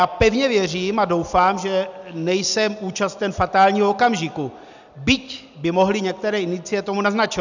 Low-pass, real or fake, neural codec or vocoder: 7.2 kHz; real; none